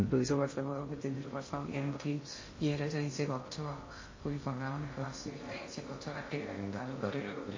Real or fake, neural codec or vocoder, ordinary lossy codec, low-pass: fake; codec, 16 kHz in and 24 kHz out, 0.6 kbps, FocalCodec, streaming, 2048 codes; MP3, 32 kbps; 7.2 kHz